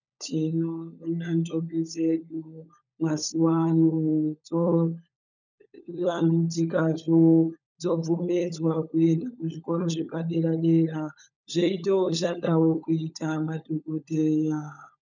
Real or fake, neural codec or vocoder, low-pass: fake; codec, 16 kHz, 16 kbps, FunCodec, trained on LibriTTS, 50 frames a second; 7.2 kHz